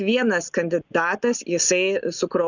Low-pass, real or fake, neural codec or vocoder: 7.2 kHz; real; none